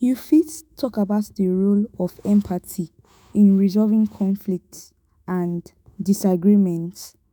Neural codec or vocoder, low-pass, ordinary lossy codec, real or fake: autoencoder, 48 kHz, 128 numbers a frame, DAC-VAE, trained on Japanese speech; none; none; fake